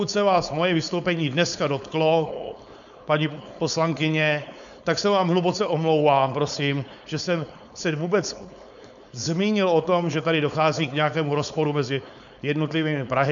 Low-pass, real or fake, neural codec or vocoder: 7.2 kHz; fake; codec, 16 kHz, 4.8 kbps, FACodec